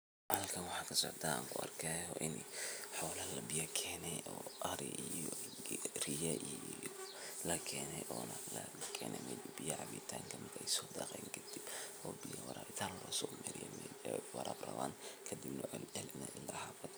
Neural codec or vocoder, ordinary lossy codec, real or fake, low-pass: none; none; real; none